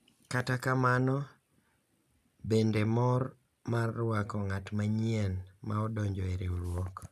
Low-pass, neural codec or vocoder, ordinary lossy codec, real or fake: 14.4 kHz; none; none; real